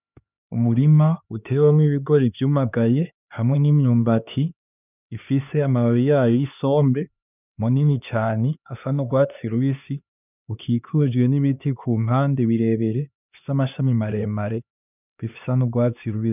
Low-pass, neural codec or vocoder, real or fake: 3.6 kHz; codec, 16 kHz, 2 kbps, X-Codec, HuBERT features, trained on LibriSpeech; fake